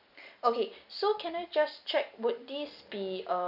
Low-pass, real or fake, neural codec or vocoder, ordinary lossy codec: 5.4 kHz; real; none; none